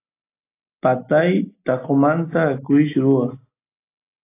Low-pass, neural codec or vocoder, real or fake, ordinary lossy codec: 3.6 kHz; none; real; AAC, 24 kbps